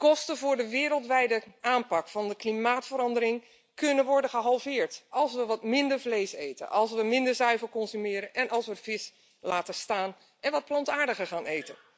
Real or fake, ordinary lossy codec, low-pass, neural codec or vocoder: real; none; none; none